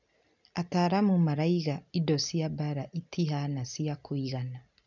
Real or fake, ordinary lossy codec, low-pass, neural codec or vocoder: real; none; 7.2 kHz; none